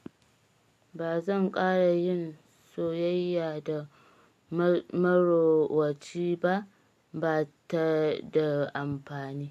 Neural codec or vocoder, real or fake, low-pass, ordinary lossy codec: none; real; 14.4 kHz; MP3, 64 kbps